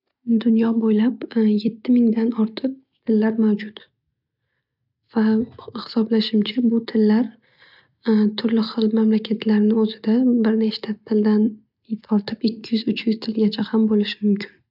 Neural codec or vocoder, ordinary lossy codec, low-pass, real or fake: none; none; 5.4 kHz; real